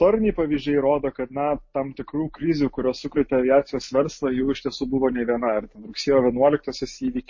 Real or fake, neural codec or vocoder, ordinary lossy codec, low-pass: real; none; MP3, 32 kbps; 7.2 kHz